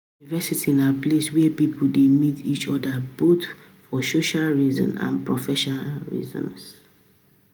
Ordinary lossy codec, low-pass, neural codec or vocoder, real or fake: none; none; none; real